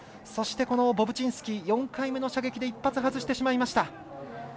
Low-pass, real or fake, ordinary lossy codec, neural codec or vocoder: none; real; none; none